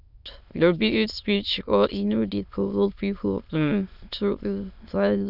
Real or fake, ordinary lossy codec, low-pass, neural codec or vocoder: fake; none; 5.4 kHz; autoencoder, 22.05 kHz, a latent of 192 numbers a frame, VITS, trained on many speakers